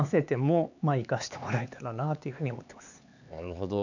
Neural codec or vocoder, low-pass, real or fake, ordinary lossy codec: codec, 16 kHz, 4 kbps, X-Codec, HuBERT features, trained on LibriSpeech; 7.2 kHz; fake; none